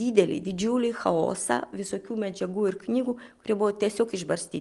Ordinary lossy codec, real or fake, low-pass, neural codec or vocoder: Opus, 32 kbps; real; 10.8 kHz; none